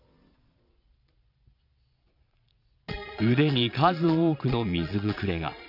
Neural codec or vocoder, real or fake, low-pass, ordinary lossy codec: vocoder, 22.05 kHz, 80 mel bands, Vocos; fake; 5.4 kHz; none